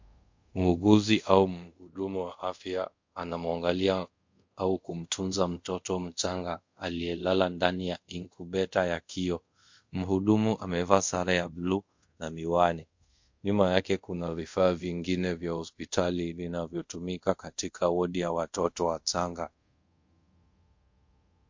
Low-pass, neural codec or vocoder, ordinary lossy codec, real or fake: 7.2 kHz; codec, 24 kHz, 0.5 kbps, DualCodec; MP3, 48 kbps; fake